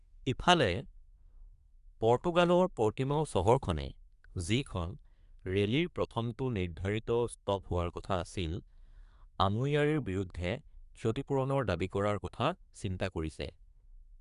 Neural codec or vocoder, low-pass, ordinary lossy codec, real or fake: codec, 24 kHz, 1 kbps, SNAC; 10.8 kHz; none; fake